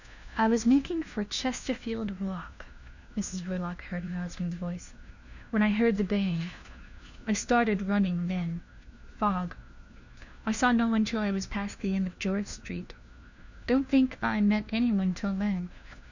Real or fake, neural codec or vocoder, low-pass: fake; codec, 16 kHz, 1 kbps, FunCodec, trained on LibriTTS, 50 frames a second; 7.2 kHz